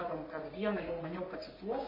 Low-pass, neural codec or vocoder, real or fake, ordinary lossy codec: 5.4 kHz; codec, 44.1 kHz, 3.4 kbps, Pupu-Codec; fake; MP3, 24 kbps